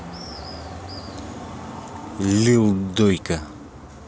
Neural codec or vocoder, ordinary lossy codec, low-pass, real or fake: none; none; none; real